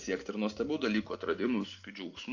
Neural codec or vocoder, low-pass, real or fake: none; 7.2 kHz; real